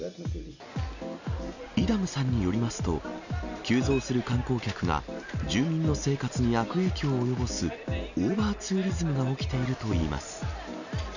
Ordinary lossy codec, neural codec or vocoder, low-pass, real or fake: none; none; 7.2 kHz; real